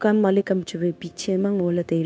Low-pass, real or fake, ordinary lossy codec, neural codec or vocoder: none; fake; none; codec, 16 kHz, 0.9 kbps, LongCat-Audio-Codec